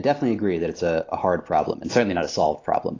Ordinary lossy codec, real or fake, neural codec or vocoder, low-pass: AAC, 32 kbps; real; none; 7.2 kHz